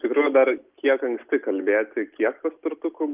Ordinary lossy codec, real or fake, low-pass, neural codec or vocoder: Opus, 24 kbps; real; 3.6 kHz; none